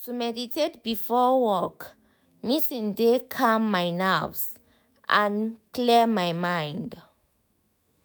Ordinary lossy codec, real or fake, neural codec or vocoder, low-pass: none; fake; autoencoder, 48 kHz, 128 numbers a frame, DAC-VAE, trained on Japanese speech; none